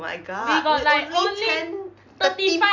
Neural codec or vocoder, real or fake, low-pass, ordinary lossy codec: none; real; 7.2 kHz; none